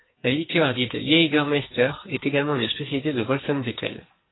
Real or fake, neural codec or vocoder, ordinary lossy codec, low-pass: fake; codec, 44.1 kHz, 2.6 kbps, SNAC; AAC, 16 kbps; 7.2 kHz